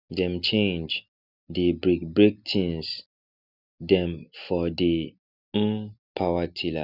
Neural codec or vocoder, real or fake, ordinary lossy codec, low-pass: none; real; none; 5.4 kHz